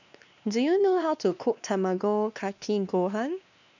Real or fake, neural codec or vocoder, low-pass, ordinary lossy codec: fake; codec, 16 kHz, 2 kbps, X-Codec, WavLM features, trained on Multilingual LibriSpeech; 7.2 kHz; none